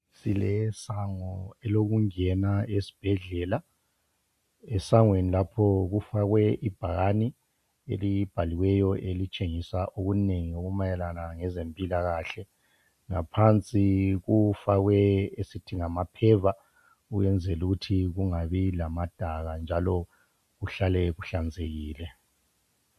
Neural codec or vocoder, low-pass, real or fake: none; 14.4 kHz; real